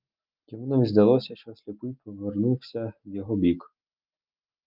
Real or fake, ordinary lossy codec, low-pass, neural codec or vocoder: real; Opus, 24 kbps; 5.4 kHz; none